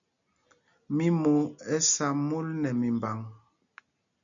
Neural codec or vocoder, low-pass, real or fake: none; 7.2 kHz; real